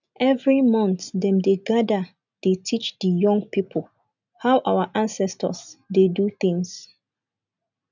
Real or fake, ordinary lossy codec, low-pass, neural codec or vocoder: real; none; 7.2 kHz; none